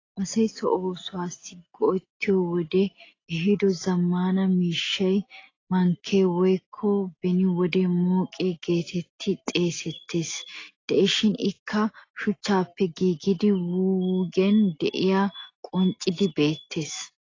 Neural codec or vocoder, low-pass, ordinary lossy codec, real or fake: none; 7.2 kHz; AAC, 32 kbps; real